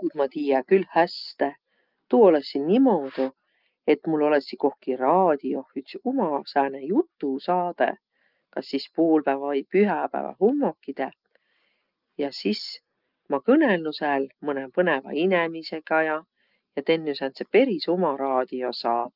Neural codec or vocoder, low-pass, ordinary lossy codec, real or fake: none; 5.4 kHz; Opus, 24 kbps; real